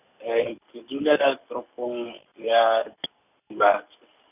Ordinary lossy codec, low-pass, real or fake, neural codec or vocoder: none; 3.6 kHz; real; none